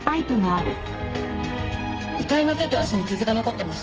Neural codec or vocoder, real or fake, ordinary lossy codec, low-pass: codec, 32 kHz, 1.9 kbps, SNAC; fake; Opus, 24 kbps; 7.2 kHz